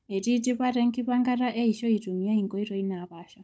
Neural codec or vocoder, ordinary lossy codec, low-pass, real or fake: codec, 16 kHz, 16 kbps, FunCodec, trained on Chinese and English, 50 frames a second; none; none; fake